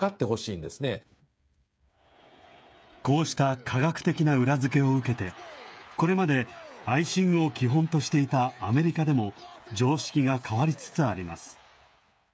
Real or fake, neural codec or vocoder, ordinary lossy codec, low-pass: fake; codec, 16 kHz, 8 kbps, FreqCodec, smaller model; none; none